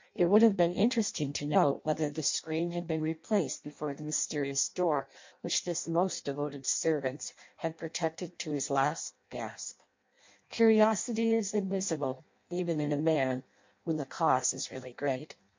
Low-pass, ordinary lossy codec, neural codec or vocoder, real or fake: 7.2 kHz; MP3, 48 kbps; codec, 16 kHz in and 24 kHz out, 0.6 kbps, FireRedTTS-2 codec; fake